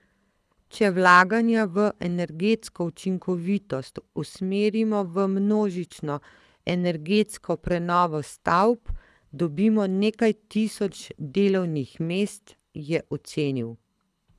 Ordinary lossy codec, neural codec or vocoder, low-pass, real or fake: none; codec, 24 kHz, 6 kbps, HILCodec; none; fake